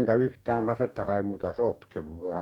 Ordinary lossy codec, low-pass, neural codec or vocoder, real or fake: Opus, 64 kbps; 19.8 kHz; codec, 44.1 kHz, 2.6 kbps, DAC; fake